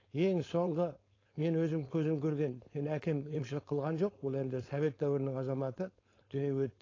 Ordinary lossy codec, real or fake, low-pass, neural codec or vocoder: AAC, 32 kbps; fake; 7.2 kHz; codec, 16 kHz, 4.8 kbps, FACodec